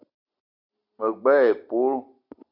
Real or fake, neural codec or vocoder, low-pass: real; none; 5.4 kHz